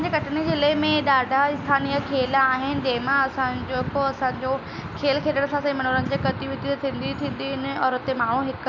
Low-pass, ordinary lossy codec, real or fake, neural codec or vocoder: 7.2 kHz; none; real; none